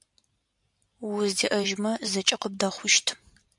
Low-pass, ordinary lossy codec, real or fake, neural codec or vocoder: 10.8 kHz; MP3, 96 kbps; fake; vocoder, 44.1 kHz, 128 mel bands every 512 samples, BigVGAN v2